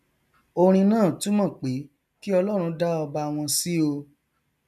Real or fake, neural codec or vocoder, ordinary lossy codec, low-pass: real; none; none; 14.4 kHz